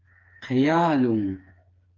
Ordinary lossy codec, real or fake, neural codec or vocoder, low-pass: Opus, 24 kbps; fake; codec, 16 kHz, 4 kbps, FreqCodec, smaller model; 7.2 kHz